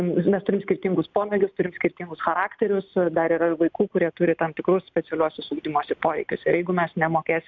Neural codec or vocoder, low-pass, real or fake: none; 7.2 kHz; real